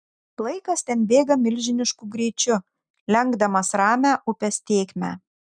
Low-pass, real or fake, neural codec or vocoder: 9.9 kHz; real; none